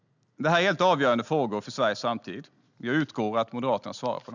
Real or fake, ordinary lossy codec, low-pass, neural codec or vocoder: real; none; 7.2 kHz; none